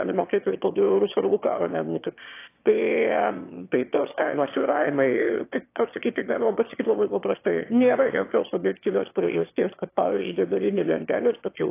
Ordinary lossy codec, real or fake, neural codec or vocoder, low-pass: AAC, 24 kbps; fake; autoencoder, 22.05 kHz, a latent of 192 numbers a frame, VITS, trained on one speaker; 3.6 kHz